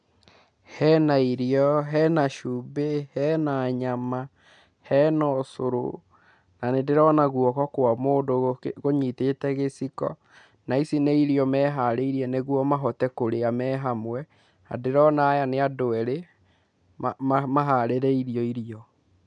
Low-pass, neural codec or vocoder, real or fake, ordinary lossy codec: 9.9 kHz; none; real; none